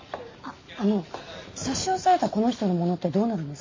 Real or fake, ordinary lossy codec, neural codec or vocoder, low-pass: real; MP3, 32 kbps; none; 7.2 kHz